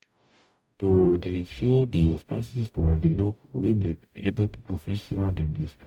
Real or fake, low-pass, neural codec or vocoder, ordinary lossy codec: fake; 19.8 kHz; codec, 44.1 kHz, 0.9 kbps, DAC; MP3, 96 kbps